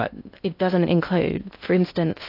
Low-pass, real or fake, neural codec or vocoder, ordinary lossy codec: 5.4 kHz; fake; codec, 16 kHz in and 24 kHz out, 0.6 kbps, FocalCodec, streaming, 2048 codes; AAC, 32 kbps